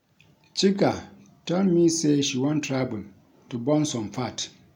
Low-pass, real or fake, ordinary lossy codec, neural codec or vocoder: 19.8 kHz; real; none; none